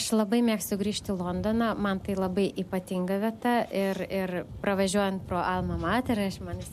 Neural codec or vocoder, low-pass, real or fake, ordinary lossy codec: none; 14.4 kHz; real; MP3, 64 kbps